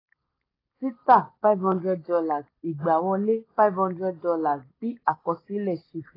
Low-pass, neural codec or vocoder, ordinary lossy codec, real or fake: 5.4 kHz; none; AAC, 24 kbps; real